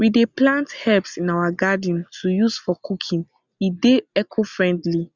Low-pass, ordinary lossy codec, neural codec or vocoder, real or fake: 7.2 kHz; Opus, 64 kbps; none; real